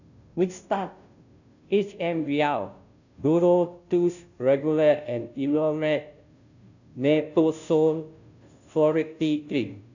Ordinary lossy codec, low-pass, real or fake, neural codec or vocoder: none; 7.2 kHz; fake; codec, 16 kHz, 0.5 kbps, FunCodec, trained on Chinese and English, 25 frames a second